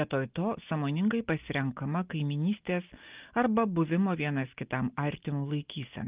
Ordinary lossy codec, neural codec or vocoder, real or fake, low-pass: Opus, 32 kbps; none; real; 3.6 kHz